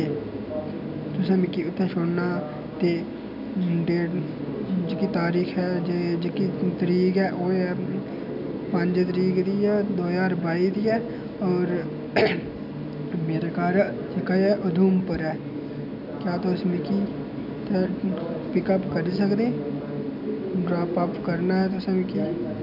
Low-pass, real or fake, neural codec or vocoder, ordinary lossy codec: 5.4 kHz; real; none; none